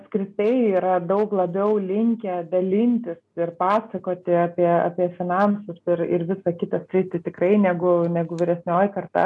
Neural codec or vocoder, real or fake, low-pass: none; real; 10.8 kHz